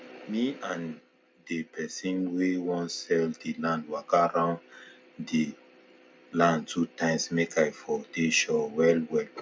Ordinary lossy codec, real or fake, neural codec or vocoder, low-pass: none; real; none; none